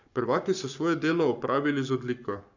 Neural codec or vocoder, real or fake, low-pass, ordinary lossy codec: codec, 44.1 kHz, 7.8 kbps, Pupu-Codec; fake; 7.2 kHz; none